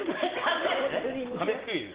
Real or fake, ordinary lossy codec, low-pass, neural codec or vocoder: real; Opus, 16 kbps; 3.6 kHz; none